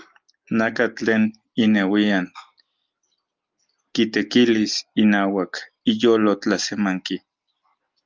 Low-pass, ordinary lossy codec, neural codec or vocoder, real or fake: 7.2 kHz; Opus, 32 kbps; none; real